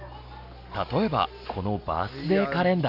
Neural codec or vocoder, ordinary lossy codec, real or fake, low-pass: none; AAC, 48 kbps; real; 5.4 kHz